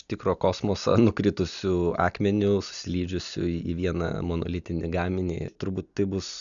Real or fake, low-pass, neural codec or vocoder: real; 7.2 kHz; none